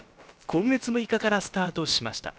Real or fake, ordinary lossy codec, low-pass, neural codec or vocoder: fake; none; none; codec, 16 kHz, about 1 kbps, DyCAST, with the encoder's durations